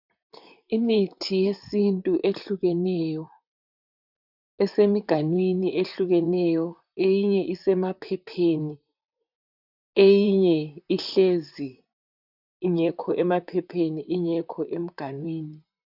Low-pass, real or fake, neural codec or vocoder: 5.4 kHz; fake; vocoder, 44.1 kHz, 128 mel bands, Pupu-Vocoder